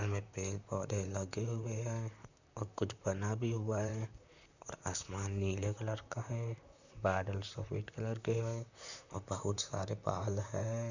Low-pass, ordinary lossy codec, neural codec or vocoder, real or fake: 7.2 kHz; none; vocoder, 44.1 kHz, 128 mel bands, Pupu-Vocoder; fake